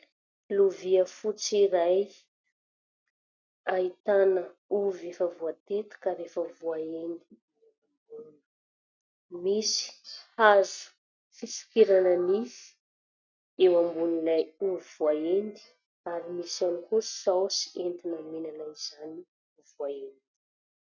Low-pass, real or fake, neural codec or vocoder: 7.2 kHz; real; none